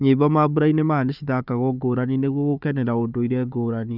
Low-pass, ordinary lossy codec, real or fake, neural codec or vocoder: 5.4 kHz; none; fake; codec, 16 kHz, 4 kbps, FunCodec, trained on Chinese and English, 50 frames a second